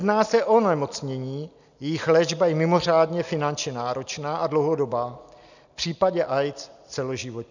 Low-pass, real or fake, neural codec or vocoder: 7.2 kHz; real; none